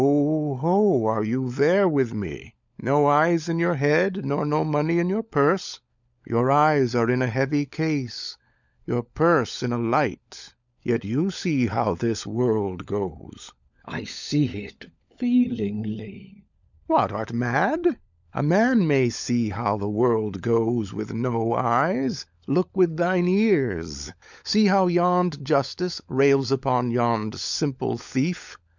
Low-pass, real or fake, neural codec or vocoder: 7.2 kHz; fake; codec, 16 kHz, 16 kbps, FunCodec, trained on LibriTTS, 50 frames a second